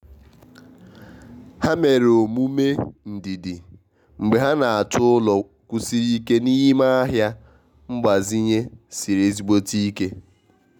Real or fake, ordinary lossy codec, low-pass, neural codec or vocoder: real; none; 19.8 kHz; none